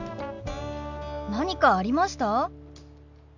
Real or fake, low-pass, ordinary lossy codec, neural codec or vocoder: real; 7.2 kHz; none; none